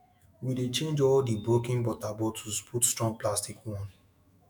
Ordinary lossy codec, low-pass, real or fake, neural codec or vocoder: none; none; fake; autoencoder, 48 kHz, 128 numbers a frame, DAC-VAE, trained on Japanese speech